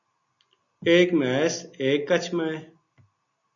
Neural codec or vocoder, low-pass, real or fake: none; 7.2 kHz; real